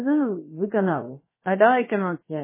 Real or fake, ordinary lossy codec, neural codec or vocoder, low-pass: fake; MP3, 16 kbps; codec, 16 kHz, about 1 kbps, DyCAST, with the encoder's durations; 3.6 kHz